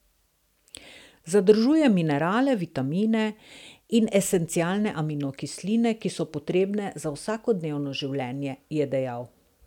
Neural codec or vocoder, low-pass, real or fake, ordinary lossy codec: none; 19.8 kHz; real; none